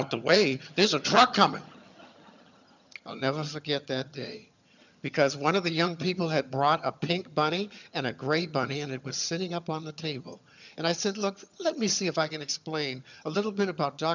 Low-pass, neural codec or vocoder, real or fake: 7.2 kHz; vocoder, 22.05 kHz, 80 mel bands, HiFi-GAN; fake